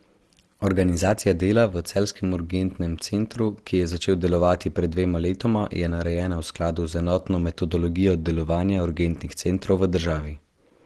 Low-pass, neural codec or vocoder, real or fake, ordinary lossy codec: 10.8 kHz; none; real; Opus, 16 kbps